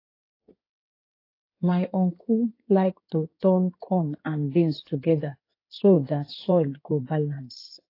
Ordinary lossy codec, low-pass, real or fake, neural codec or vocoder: AAC, 32 kbps; 5.4 kHz; fake; codec, 16 kHz in and 24 kHz out, 2.2 kbps, FireRedTTS-2 codec